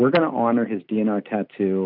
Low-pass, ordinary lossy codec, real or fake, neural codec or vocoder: 5.4 kHz; MP3, 48 kbps; real; none